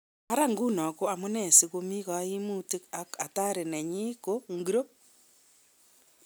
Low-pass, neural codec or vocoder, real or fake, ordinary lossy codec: none; none; real; none